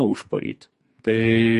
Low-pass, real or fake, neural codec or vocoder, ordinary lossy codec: 14.4 kHz; fake; codec, 44.1 kHz, 2.6 kbps, SNAC; MP3, 48 kbps